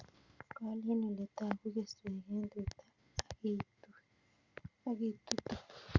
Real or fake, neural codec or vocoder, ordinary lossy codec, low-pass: real; none; Opus, 64 kbps; 7.2 kHz